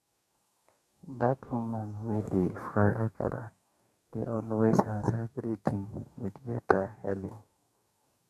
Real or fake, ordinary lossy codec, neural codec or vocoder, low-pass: fake; none; codec, 44.1 kHz, 2.6 kbps, DAC; 14.4 kHz